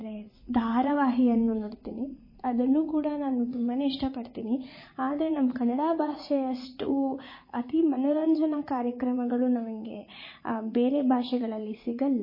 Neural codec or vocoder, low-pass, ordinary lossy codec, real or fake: vocoder, 44.1 kHz, 80 mel bands, Vocos; 5.4 kHz; MP3, 24 kbps; fake